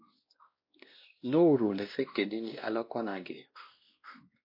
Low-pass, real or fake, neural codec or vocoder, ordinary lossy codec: 5.4 kHz; fake; codec, 16 kHz, 1 kbps, X-Codec, WavLM features, trained on Multilingual LibriSpeech; MP3, 32 kbps